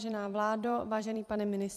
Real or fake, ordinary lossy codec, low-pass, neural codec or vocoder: fake; AAC, 96 kbps; 14.4 kHz; vocoder, 44.1 kHz, 128 mel bands every 256 samples, BigVGAN v2